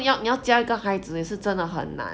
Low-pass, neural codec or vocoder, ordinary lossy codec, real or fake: none; none; none; real